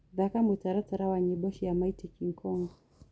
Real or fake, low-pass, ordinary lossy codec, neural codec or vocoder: real; none; none; none